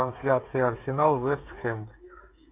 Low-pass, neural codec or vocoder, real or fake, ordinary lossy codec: 3.6 kHz; codec, 16 kHz, 8 kbps, FreqCodec, smaller model; fake; MP3, 32 kbps